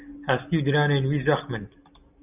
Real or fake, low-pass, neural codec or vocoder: real; 3.6 kHz; none